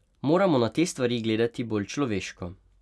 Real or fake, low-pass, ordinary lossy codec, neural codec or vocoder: real; none; none; none